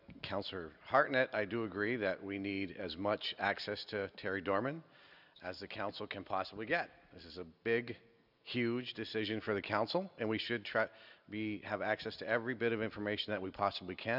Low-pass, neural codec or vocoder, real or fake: 5.4 kHz; none; real